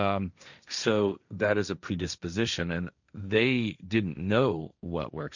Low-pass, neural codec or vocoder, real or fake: 7.2 kHz; codec, 16 kHz, 1.1 kbps, Voila-Tokenizer; fake